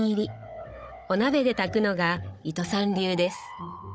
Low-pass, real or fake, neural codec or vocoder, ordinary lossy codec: none; fake; codec, 16 kHz, 16 kbps, FunCodec, trained on Chinese and English, 50 frames a second; none